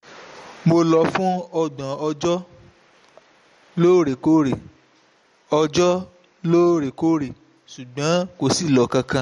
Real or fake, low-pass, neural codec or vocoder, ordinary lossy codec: real; 19.8 kHz; none; MP3, 48 kbps